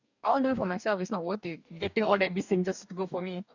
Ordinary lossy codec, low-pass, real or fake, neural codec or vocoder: none; 7.2 kHz; fake; codec, 44.1 kHz, 2.6 kbps, DAC